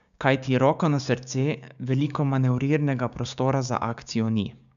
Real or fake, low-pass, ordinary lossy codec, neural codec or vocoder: fake; 7.2 kHz; none; codec, 16 kHz, 6 kbps, DAC